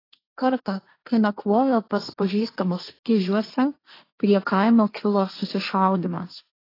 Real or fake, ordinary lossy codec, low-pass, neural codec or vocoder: fake; AAC, 24 kbps; 5.4 kHz; codec, 16 kHz, 1.1 kbps, Voila-Tokenizer